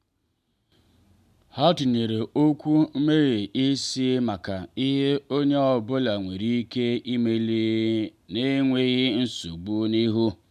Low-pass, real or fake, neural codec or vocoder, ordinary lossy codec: 14.4 kHz; real; none; none